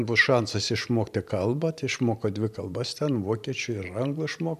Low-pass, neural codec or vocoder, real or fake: 14.4 kHz; vocoder, 44.1 kHz, 128 mel bands every 512 samples, BigVGAN v2; fake